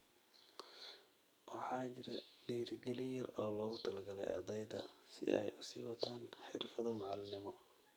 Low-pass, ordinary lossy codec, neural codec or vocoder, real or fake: none; none; codec, 44.1 kHz, 2.6 kbps, SNAC; fake